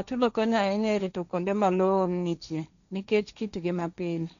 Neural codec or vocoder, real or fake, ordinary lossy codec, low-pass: codec, 16 kHz, 1.1 kbps, Voila-Tokenizer; fake; none; 7.2 kHz